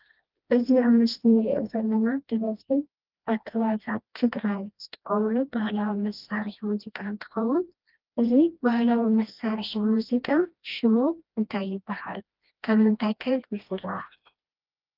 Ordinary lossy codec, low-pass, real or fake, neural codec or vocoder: Opus, 32 kbps; 5.4 kHz; fake; codec, 16 kHz, 1 kbps, FreqCodec, smaller model